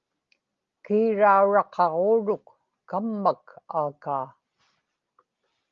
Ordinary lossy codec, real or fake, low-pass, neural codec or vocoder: Opus, 32 kbps; real; 7.2 kHz; none